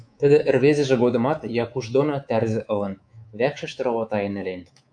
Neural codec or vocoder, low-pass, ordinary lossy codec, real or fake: codec, 24 kHz, 3.1 kbps, DualCodec; 9.9 kHz; AAC, 64 kbps; fake